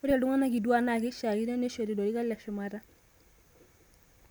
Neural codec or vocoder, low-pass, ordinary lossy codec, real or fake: none; none; none; real